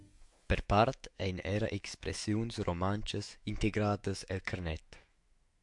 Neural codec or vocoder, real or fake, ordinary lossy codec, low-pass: autoencoder, 48 kHz, 128 numbers a frame, DAC-VAE, trained on Japanese speech; fake; MP3, 64 kbps; 10.8 kHz